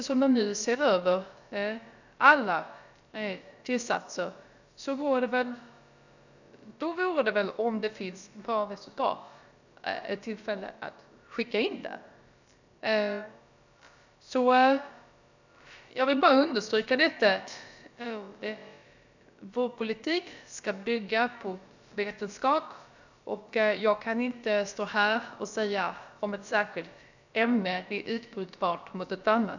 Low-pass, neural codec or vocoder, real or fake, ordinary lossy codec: 7.2 kHz; codec, 16 kHz, about 1 kbps, DyCAST, with the encoder's durations; fake; none